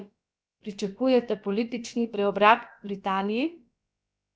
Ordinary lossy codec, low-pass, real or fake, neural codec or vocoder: none; none; fake; codec, 16 kHz, about 1 kbps, DyCAST, with the encoder's durations